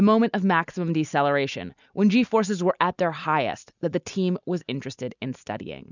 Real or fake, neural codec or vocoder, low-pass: real; none; 7.2 kHz